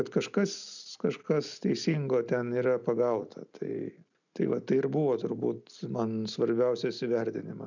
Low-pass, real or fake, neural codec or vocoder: 7.2 kHz; real; none